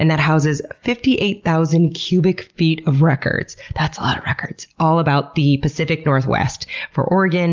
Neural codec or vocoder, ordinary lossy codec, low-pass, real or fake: none; Opus, 32 kbps; 7.2 kHz; real